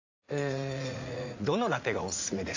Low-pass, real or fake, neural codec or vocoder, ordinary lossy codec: 7.2 kHz; fake; vocoder, 44.1 kHz, 80 mel bands, Vocos; AAC, 48 kbps